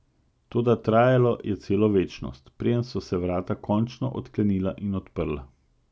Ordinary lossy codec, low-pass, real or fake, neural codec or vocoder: none; none; real; none